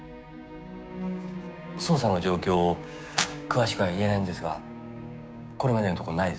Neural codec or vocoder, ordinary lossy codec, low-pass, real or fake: codec, 16 kHz, 6 kbps, DAC; none; none; fake